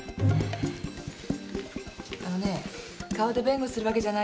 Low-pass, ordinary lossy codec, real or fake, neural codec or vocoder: none; none; real; none